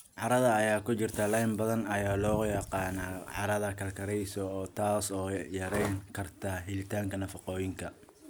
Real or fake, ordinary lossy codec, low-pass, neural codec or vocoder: real; none; none; none